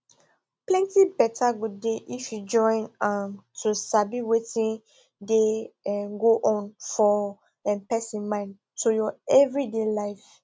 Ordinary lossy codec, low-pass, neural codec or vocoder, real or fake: none; none; none; real